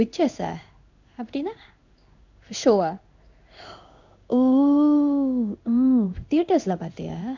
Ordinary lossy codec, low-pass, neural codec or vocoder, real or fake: none; 7.2 kHz; codec, 16 kHz in and 24 kHz out, 1 kbps, XY-Tokenizer; fake